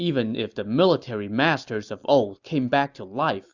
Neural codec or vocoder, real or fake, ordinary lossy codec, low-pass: none; real; Opus, 64 kbps; 7.2 kHz